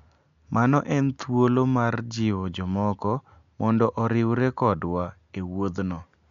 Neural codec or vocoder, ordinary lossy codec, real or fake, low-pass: none; MP3, 64 kbps; real; 7.2 kHz